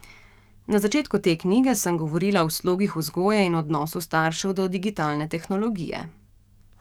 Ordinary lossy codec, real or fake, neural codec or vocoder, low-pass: none; fake; codec, 44.1 kHz, 7.8 kbps, DAC; 19.8 kHz